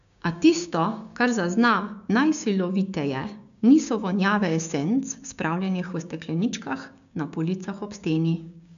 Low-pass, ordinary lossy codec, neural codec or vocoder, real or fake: 7.2 kHz; none; codec, 16 kHz, 6 kbps, DAC; fake